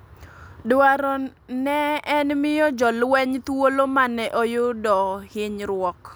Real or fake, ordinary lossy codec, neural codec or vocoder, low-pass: real; none; none; none